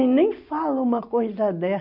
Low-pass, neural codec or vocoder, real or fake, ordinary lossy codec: 5.4 kHz; none; real; none